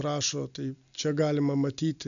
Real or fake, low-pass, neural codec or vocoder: real; 7.2 kHz; none